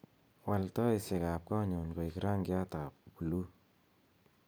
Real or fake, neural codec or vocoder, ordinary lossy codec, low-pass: real; none; none; none